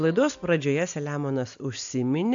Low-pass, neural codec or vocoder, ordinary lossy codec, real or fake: 7.2 kHz; none; AAC, 64 kbps; real